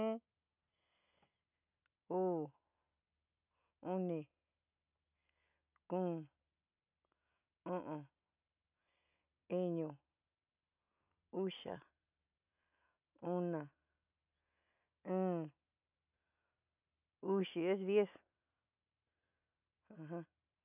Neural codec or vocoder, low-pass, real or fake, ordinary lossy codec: none; 3.6 kHz; real; none